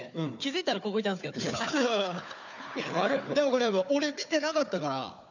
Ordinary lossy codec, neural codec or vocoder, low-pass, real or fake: none; codec, 16 kHz, 4 kbps, FunCodec, trained on Chinese and English, 50 frames a second; 7.2 kHz; fake